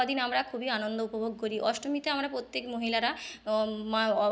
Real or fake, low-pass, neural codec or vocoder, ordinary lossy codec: real; none; none; none